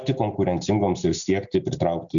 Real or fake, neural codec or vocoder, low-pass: real; none; 7.2 kHz